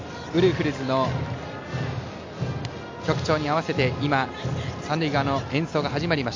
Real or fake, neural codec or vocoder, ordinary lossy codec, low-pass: real; none; MP3, 64 kbps; 7.2 kHz